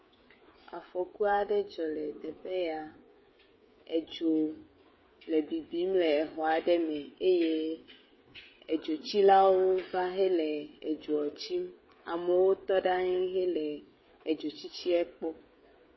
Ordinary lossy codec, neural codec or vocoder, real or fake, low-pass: MP3, 24 kbps; codec, 16 kHz, 16 kbps, FreqCodec, smaller model; fake; 7.2 kHz